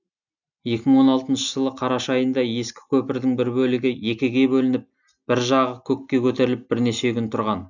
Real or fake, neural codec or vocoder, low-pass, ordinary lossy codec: real; none; 7.2 kHz; none